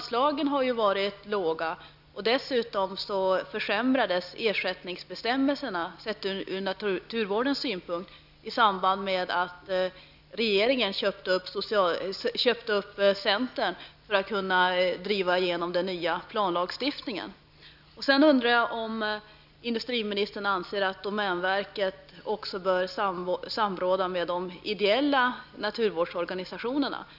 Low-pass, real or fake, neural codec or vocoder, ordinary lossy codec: 5.4 kHz; real; none; none